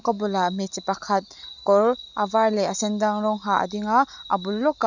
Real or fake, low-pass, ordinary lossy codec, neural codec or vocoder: real; 7.2 kHz; none; none